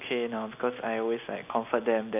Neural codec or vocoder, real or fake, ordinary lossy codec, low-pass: none; real; none; 3.6 kHz